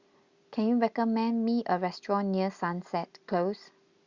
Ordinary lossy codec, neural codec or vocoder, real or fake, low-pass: Opus, 64 kbps; none; real; 7.2 kHz